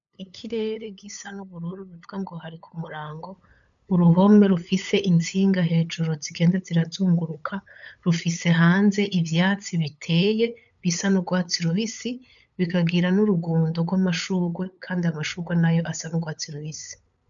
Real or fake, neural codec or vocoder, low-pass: fake; codec, 16 kHz, 8 kbps, FunCodec, trained on LibriTTS, 25 frames a second; 7.2 kHz